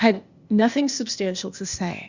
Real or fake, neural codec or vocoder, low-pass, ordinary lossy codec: fake; codec, 16 kHz, 0.8 kbps, ZipCodec; 7.2 kHz; Opus, 64 kbps